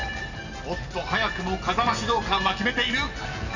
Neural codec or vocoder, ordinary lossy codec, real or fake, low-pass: none; none; real; 7.2 kHz